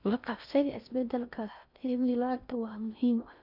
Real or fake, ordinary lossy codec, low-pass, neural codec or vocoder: fake; none; 5.4 kHz; codec, 16 kHz in and 24 kHz out, 0.6 kbps, FocalCodec, streaming, 4096 codes